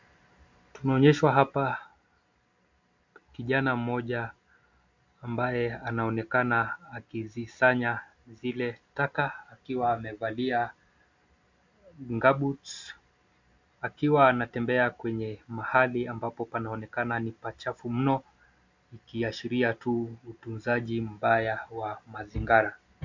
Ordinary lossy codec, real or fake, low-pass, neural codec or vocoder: MP3, 64 kbps; real; 7.2 kHz; none